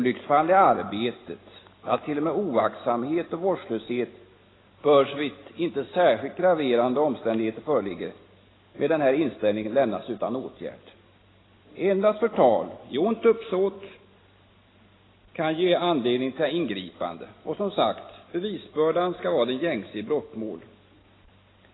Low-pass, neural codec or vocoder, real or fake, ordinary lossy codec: 7.2 kHz; none; real; AAC, 16 kbps